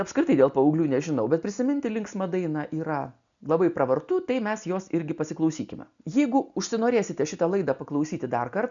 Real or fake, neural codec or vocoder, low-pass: real; none; 7.2 kHz